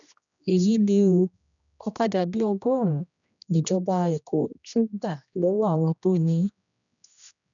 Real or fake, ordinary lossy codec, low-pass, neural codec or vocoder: fake; none; 7.2 kHz; codec, 16 kHz, 1 kbps, X-Codec, HuBERT features, trained on general audio